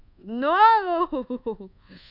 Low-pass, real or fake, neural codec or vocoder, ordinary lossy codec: 5.4 kHz; fake; codec, 24 kHz, 1.2 kbps, DualCodec; none